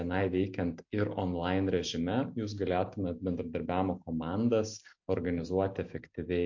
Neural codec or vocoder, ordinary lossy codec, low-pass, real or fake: none; MP3, 48 kbps; 7.2 kHz; real